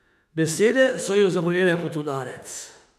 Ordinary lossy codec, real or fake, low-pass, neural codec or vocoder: none; fake; 14.4 kHz; autoencoder, 48 kHz, 32 numbers a frame, DAC-VAE, trained on Japanese speech